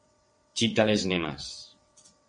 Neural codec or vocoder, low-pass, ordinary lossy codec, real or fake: vocoder, 22.05 kHz, 80 mel bands, WaveNeXt; 9.9 kHz; MP3, 48 kbps; fake